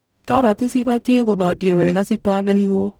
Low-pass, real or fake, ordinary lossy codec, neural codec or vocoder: none; fake; none; codec, 44.1 kHz, 0.9 kbps, DAC